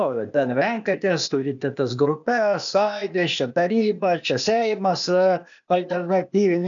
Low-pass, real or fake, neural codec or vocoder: 7.2 kHz; fake; codec, 16 kHz, 0.8 kbps, ZipCodec